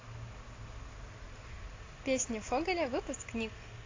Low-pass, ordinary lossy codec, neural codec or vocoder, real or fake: 7.2 kHz; AAC, 32 kbps; none; real